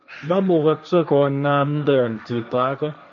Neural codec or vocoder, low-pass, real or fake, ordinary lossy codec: codec, 16 kHz, 1.1 kbps, Voila-Tokenizer; 7.2 kHz; fake; none